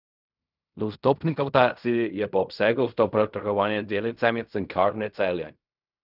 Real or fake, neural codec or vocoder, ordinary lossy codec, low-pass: fake; codec, 16 kHz in and 24 kHz out, 0.4 kbps, LongCat-Audio-Codec, fine tuned four codebook decoder; none; 5.4 kHz